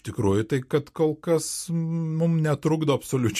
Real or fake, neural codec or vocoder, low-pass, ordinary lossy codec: real; none; 14.4 kHz; MP3, 64 kbps